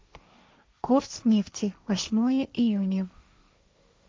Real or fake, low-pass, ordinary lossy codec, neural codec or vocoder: fake; none; none; codec, 16 kHz, 1.1 kbps, Voila-Tokenizer